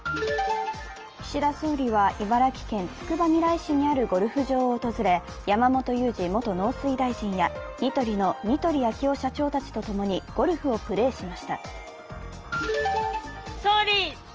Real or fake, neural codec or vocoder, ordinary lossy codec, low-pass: real; none; Opus, 24 kbps; 7.2 kHz